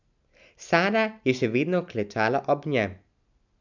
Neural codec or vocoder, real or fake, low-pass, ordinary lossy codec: none; real; 7.2 kHz; none